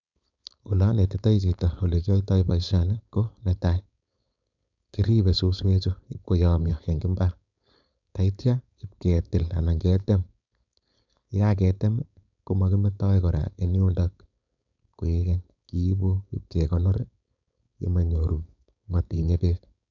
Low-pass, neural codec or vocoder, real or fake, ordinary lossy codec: 7.2 kHz; codec, 16 kHz, 4.8 kbps, FACodec; fake; none